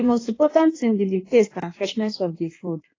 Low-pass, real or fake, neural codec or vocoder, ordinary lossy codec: 7.2 kHz; fake; codec, 16 kHz in and 24 kHz out, 1.1 kbps, FireRedTTS-2 codec; AAC, 32 kbps